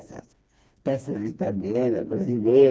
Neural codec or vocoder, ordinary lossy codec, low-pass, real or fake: codec, 16 kHz, 2 kbps, FreqCodec, smaller model; none; none; fake